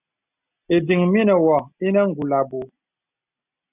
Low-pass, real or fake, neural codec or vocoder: 3.6 kHz; real; none